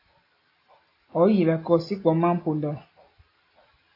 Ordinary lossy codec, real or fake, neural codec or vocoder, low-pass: AAC, 24 kbps; real; none; 5.4 kHz